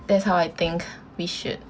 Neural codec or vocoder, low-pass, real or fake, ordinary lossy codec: none; none; real; none